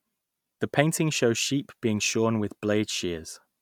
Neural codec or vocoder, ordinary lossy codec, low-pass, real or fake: none; none; 19.8 kHz; real